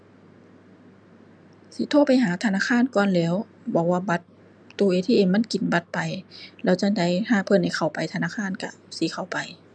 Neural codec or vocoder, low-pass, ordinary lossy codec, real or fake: none; 9.9 kHz; none; real